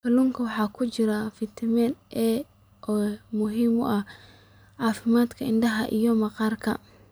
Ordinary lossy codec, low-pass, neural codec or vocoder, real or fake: none; none; none; real